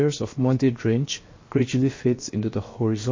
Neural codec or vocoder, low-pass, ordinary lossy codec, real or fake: codec, 16 kHz, 0.7 kbps, FocalCodec; 7.2 kHz; MP3, 32 kbps; fake